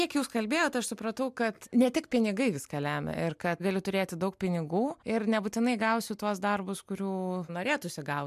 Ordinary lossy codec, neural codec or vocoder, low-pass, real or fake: MP3, 96 kbps; none; 14.4 kHz; real